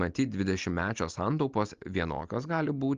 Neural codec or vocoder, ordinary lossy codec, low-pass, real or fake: none; Opus, 32 kbps; 7.2 kHz; real